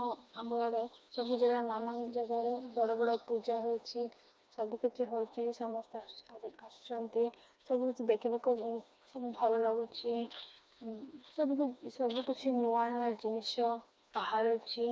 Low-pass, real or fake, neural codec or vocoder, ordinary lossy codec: none; fake; codec, 16 kHz, 2 kbps, FreqCodec, smaller model; none